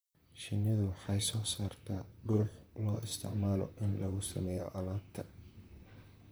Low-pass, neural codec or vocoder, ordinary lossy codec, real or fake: none; vocoder, 44.1 kHz, 128 mel bands, Pupu-Vocoder; none; fake